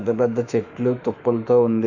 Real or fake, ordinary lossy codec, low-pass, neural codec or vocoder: fake; none; 7.2 kHz; autoencoder, 48 kHz, 32 numbers a frame, DAC-VAE, trained on Japanese speech